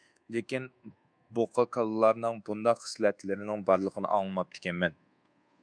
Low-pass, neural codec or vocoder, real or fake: 9.9 kHz; codec, 24 kHz, 1.2 kbps, DualCodec; fake